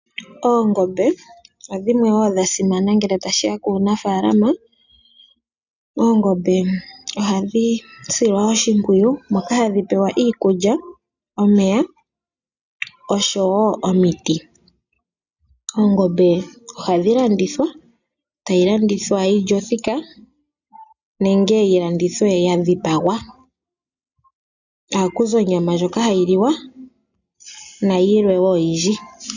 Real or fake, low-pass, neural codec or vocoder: real; 7.2 kHz; none